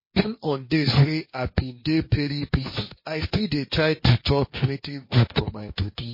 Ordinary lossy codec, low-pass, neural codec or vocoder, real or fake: MP3, 24 kbps; 5.4 kHz; codec, 16 kHz, 1.1 kbps, Voila-Tokenizer; fake